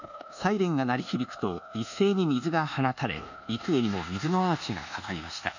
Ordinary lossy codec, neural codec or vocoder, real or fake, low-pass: none; codec, 24 kHz, 1.2 kbps, DualCodec; fake; 7.2 kHz